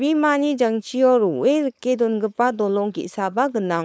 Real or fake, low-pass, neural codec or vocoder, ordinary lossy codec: fake; none; codec, 16 kHz, 4.8 kbps, FACodec; none